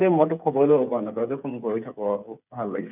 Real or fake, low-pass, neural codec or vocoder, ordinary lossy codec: fake; 3.6 kHz; codec, 16 kHz, 8 kbps, FreqCodec, smaller model; none